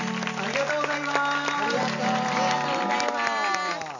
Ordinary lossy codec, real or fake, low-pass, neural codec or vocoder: none; real; 7.2 kHz; none